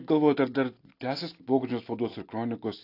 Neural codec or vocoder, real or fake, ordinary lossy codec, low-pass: none; real; AAC, 32 kbps; 5.4 kHz